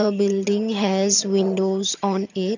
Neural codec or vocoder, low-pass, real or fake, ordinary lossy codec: vocoder, 22.05 kHz, 80 mel bands, HiFi-GAN; 7.2 kHz; fake; none